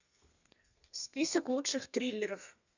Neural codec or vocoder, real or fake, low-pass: codec, 32 kHz, 1.9 kbps, SNAC; fake; 7.2 kHz